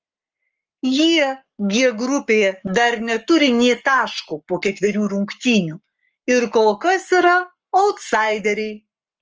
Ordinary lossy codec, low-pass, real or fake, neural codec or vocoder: Opus, 24 kbps; 7.2 kHz; fake; codec, 44.1 kHz, 7.8 kbps, Pupu-Codec